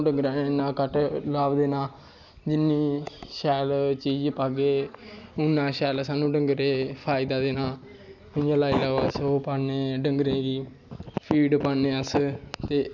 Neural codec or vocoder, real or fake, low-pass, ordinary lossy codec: none; real; 7.2 kHz; none